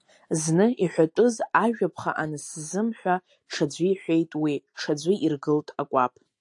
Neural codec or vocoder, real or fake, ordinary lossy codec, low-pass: none; real; AAC, 64 kbps; 10.8 kHz